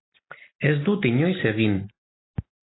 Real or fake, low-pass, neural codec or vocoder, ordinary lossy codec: real; 7.2 kHz; none; AAC, 16 kbps